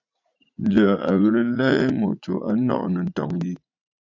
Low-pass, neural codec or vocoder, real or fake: 7.2 kHz; vocoder, 44.1 kHz, 80 mel bands, Vocos; fake